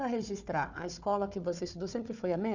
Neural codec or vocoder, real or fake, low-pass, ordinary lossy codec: codec, 16 kHz, 4 kbps, FunCodec, trained on Chinese and English, 50 frames a second; fake; 7.2 kHz; none